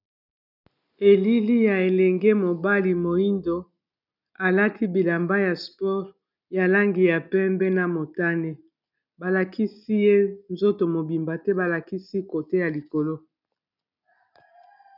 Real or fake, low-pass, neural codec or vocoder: real; 5.4 kHz; none